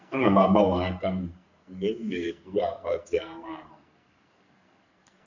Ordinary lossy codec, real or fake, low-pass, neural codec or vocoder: none; fake; 7.2 kHz; codec, 44.1 kHz, 2.6 kbps, SNAC